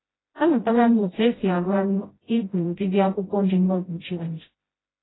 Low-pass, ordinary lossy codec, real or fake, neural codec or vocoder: 7.2 kHz; AAC, 16 kbps; fake; codec, 16 kHz, 0.5 kbps, FreqCodec, smaller model